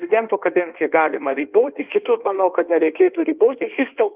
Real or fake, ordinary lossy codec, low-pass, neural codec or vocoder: fake; Opus, 24 kbps; 3.6 kHz; codec, 16 kHz in and 24 kHz out, 1.1 kbps, FireRedTTS-2 codec